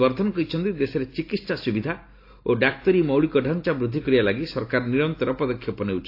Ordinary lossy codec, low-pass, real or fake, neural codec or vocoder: AAC, 32 kbps; 5.4 kHz; real; none